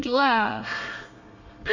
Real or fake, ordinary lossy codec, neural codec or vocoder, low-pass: fake; Opus, 64 kbps; codec, 24 kHz, 1 kbps, SNAC; 7.2 kHz